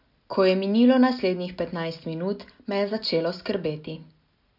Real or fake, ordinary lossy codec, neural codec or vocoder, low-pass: real; none; none; 5.4 kHz